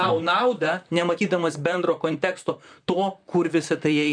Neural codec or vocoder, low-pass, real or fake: vocoder, 44.1 kHz, 128 mel bands, Pupu-Vocoder; 9.9 kHz; fake